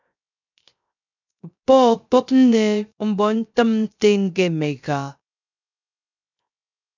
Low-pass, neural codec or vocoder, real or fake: 7.2 kHz; codec, 16 kHz, 0.3 kbps, FocalCodec; fake